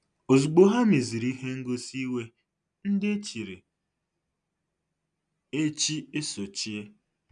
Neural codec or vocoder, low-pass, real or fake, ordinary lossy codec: none; 9.9 kHz; real; none